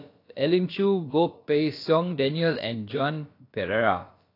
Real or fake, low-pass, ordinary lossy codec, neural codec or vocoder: fake; 5.4 kHz; AAC, 32 kbps; codec, 16 kHz, about 1 kbps, DyCAST, with the encoder's durations